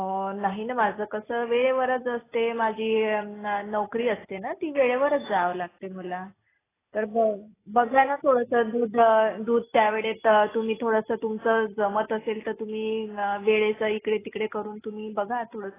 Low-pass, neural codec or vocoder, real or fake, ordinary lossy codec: 3.6 kHz; none; real; AAC, 16 kbps